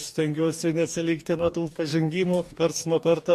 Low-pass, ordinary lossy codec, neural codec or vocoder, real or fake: 14.4 kHz; MP3, 64 kbps; codec, 44.1 kHz, 2.6 kbps, DAC; fake